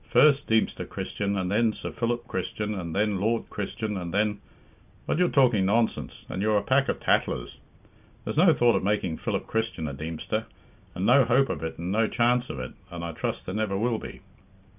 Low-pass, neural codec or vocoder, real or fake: 3.6 kHz; none; real